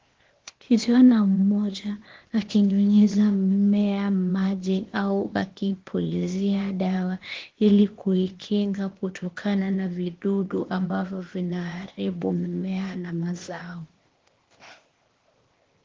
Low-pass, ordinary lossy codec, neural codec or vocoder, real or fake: 7.2 kHz; Opus, 16 kbps; codec, 16 kHz, 0.8 kbps, ZipCodec; fake